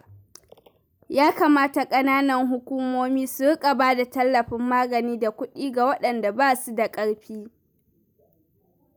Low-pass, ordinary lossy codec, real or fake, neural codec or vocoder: none; none; real; none